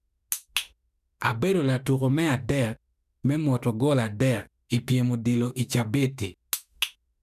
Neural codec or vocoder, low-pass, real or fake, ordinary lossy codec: autoencoder, 48 kHz, 32 numbers a frame, DAC-VAE, trained on Japanese speech; 14.4 kHz; fake; none